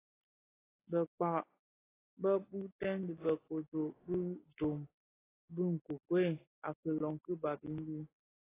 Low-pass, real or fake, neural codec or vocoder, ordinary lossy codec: 3.6 kHz; real; none; AAC, 16 kbps